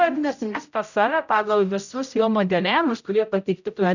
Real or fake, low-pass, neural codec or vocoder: fake; 7.2 kHz; codec, 16 kHz, 0.5 kbps, X-Codec, HuBERT features, trained on general audio